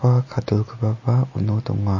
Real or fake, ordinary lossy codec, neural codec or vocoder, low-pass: real; MP3, 32 kbps; none; 7.2 kHz